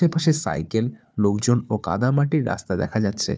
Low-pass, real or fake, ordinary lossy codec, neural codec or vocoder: none; fake; none; codec, 16 kHz, 4 kbps, FunCodec, trained on Chinese and English, 50 frames a second